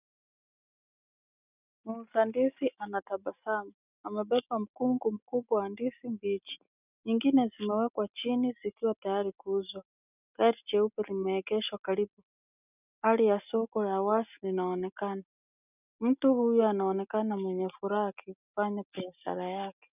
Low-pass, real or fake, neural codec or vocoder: 3.6 kHz; real; none